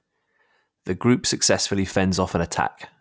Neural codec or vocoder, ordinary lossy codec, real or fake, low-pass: none; none; real; none